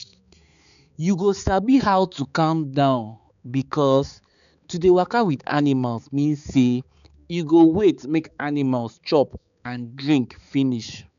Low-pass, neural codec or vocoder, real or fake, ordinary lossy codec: 7.2 kHz; codec, 16 kHz, 4 kbps, X-Codec, HuBERT features, trained on balanced general audio; fake; none